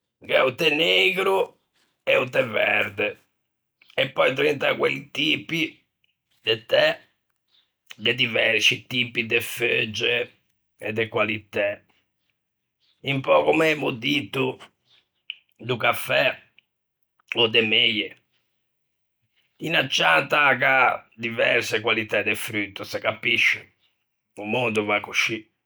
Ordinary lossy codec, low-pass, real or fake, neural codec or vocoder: none; none; real; none